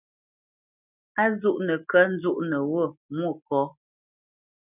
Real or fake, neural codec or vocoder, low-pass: real; none; 3.6 kHz